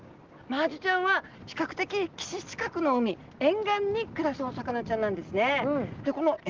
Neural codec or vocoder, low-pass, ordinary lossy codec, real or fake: none; 7.2 kHz; Opus, 16 kbps; real